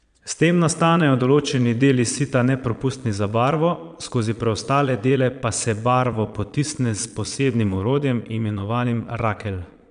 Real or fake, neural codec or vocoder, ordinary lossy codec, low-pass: fake; vocoder, 22.05 kHz, 80 mel bands, Vocos; none; 9.9 kHz